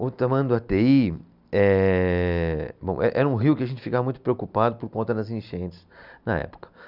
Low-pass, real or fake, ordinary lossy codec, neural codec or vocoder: 5.4 kHz; real; none; none